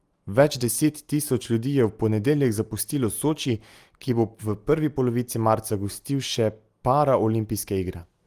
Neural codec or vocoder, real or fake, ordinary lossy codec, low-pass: none; real; Opus, 24 kbps; 14.4 kHz